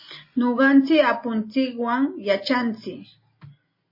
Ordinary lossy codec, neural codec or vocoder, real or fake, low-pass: MP3, 24 kbps; none; real; 5.4 kHz